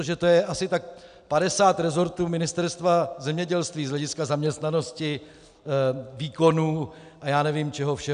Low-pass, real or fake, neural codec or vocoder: 9.9 kHz; real; none